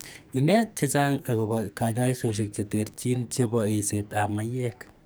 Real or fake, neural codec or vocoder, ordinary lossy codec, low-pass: fake; codec, 44.1 kHz, 2.6 kbps, SNAC; none; none